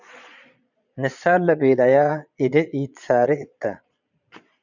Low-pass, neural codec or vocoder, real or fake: 7.2 kHz; vocoder, 22.05 kHz, 80 mel bands, Vocos; fake